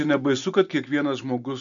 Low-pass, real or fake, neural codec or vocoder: 7.2 kHz; real; none